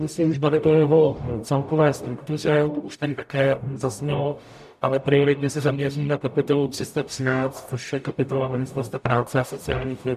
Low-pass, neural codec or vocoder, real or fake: 14.4 kHz; codec, 44.1 kHz, 0.9 kbps, DAC; fake